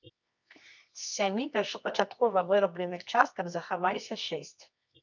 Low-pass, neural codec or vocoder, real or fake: 7.2 kHz; codec, 24 kHz, 0.9 kbps, WavTokenizer, medium music audio release; fake